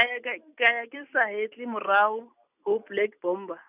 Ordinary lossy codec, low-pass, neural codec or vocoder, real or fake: none; 3.6 kHz; none; real